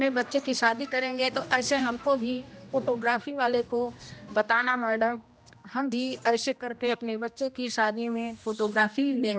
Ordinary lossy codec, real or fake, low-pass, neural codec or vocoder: none; fake; none; codec, 16 kHz, 1 kbps, X-Codec, HuBERT features, trained on general audio